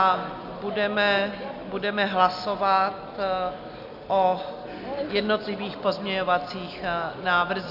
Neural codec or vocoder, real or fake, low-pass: none; real; 5.4 kHz